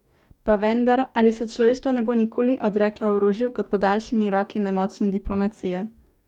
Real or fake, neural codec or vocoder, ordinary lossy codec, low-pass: fake; codec, 44.1 kHz, 2.6 kbps, DAC; none; 19.8 kHz